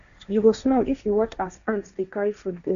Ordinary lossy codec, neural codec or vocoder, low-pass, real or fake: none; codec, 16 kHz, 1.1 kbps, Voila-Tokenizer; 7.2 kHz; fake